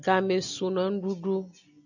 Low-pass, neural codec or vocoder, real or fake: 7.2 kHz; none; real